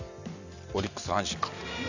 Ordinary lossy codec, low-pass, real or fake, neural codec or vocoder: none; 7.2 kHz; real; none